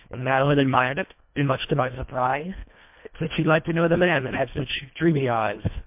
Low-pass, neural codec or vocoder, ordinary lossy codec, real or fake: 3.6 kHz; codec, 24 kHz, 1.5 kbps, HILCodec; MP3, 32 kbps; fake